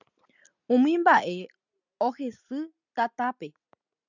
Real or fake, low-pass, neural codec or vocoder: real; 7.2 kHz; none